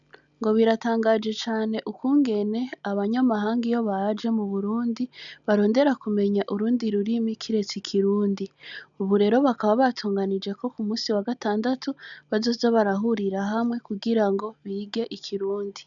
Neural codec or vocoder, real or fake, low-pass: none; real; 7.2 kHz